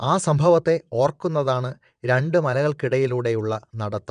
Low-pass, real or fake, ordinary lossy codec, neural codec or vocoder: 9.9 kHz; fake; none; vocoder, 22.05 kHz, 80 mel bands, WaveNeXt